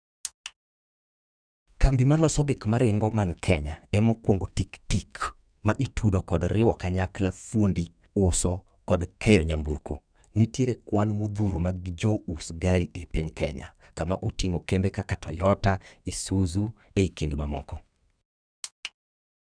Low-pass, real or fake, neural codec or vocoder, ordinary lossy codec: 9.9 kHz; fake; codec, 32 kHz, 1.9 kbps, SNAC; none